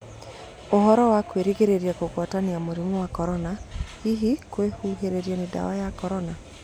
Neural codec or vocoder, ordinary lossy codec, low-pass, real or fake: none; none; 19.8 kHz; real